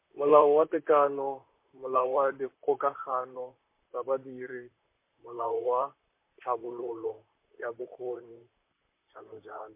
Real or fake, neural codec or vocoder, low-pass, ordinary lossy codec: fake; vocoder, 44.1 kHz, 128 mel bands, Pupu-Vocoder; 3.6 kHz; MP3, 24 kbps